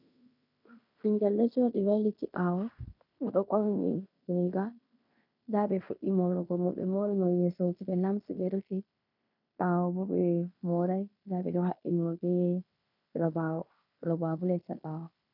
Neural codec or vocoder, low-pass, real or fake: codec, 16 kHz in and 24 kHz out, 0.9 kbps, LongCat-Audio-Codec, fine tuned four codebook decoder; 5.4 kHz; fake